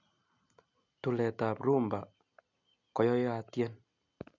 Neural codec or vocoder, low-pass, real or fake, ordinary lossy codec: none; 7.2 kHz; real; none